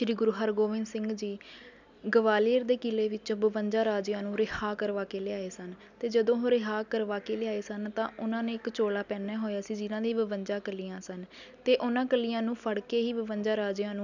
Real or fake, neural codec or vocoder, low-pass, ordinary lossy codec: real; none; 7.2 kHz; none